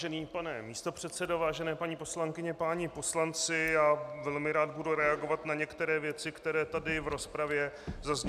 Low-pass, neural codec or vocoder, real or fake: 14.4 kHz; none; real